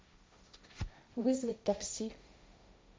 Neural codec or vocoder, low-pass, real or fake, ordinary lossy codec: codec, 16 kHz, 1.1 kbps, Voila-Tokenizer; none; fake; none